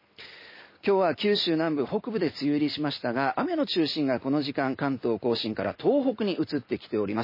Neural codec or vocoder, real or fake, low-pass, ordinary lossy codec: none; real; 5.4 kHz; MP3, 24 kbps